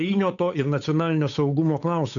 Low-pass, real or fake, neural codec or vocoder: 7.2 kHz; fake; codec, 16 kHz, 8 kbps, FunCodec, trained on Chinese and English, 25 frames a second